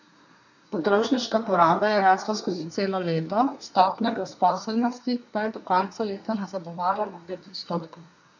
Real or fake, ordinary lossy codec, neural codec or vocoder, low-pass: fake; none; codec, 24 kHz, 1 kbps, SNAC; 7.2 kHz